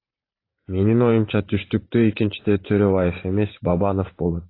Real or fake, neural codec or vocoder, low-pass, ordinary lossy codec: fake; vocoder, 44.1 kHz, 128 mel bands every 512 samples, BigVGAN v2; 5.4 kHz; AAC, 24 kbps